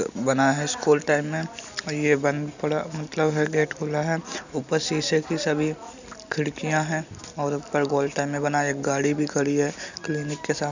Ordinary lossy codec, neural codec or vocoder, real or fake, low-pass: none; none; real; 7.2 kHz